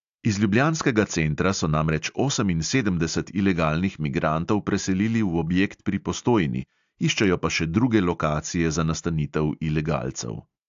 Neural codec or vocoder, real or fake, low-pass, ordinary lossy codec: none; real; 7.2 kHz; AAC, 64 kbps